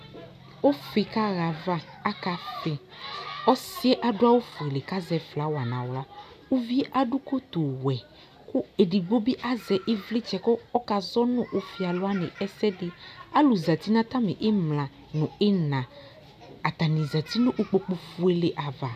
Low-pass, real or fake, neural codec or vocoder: 14.4 kHz; real; none